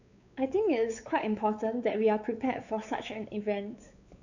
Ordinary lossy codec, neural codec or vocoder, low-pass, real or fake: none; codec, 16 kHz, 4 kbps, X-Codec, WavLM features, trained on Multilingual LibriSpeech; 7.2 kHz; fake